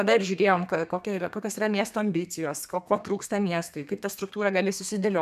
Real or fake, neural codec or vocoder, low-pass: fake; codec, 32 kHz, 1.9 kbps, SNAC; 14.4 kHz